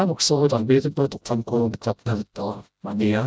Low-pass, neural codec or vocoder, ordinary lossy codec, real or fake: none; codec, 16 kHz, 0.5 kbps, FreqCodec, smaller model; none; fake